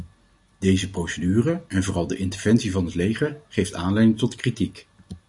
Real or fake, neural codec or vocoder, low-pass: real; none; 10.8 kHz